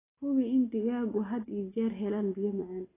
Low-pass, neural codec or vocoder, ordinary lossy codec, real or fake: 3.6 kHz; none; AAC, 16 kbps; real